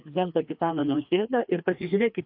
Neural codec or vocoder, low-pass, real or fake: codec, 16 kHz, 2 kbps, FreqCodec, larger model; 5.4 kHz; fake